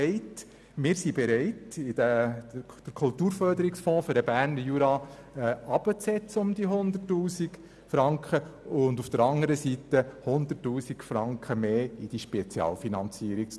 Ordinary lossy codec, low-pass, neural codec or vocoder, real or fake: none; none; none; real